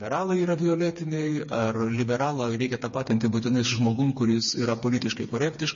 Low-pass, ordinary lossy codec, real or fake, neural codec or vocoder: 7.2 kHz; MP3, 32 kbps; fake; codec, 16 kHz, 4 kbps, FreqCodec, smaller model